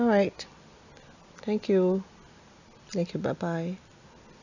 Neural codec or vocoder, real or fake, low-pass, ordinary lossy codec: none; real; 7.2 kHz; none